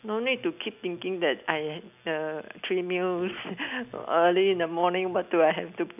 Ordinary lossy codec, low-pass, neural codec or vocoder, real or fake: none; 3.6 kHz; none; real